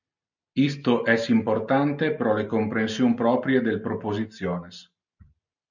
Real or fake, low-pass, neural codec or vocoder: real; 7.2 kHz; none